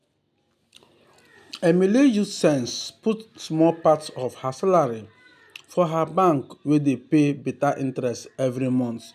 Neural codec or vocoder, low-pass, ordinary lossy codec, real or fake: none; 14.4 kHz; none; real